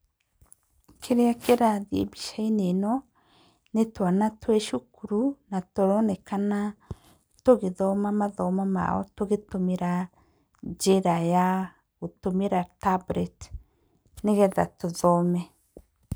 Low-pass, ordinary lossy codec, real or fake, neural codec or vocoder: none; none; real; none